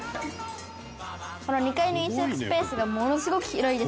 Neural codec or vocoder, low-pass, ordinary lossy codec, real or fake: none; none; none; real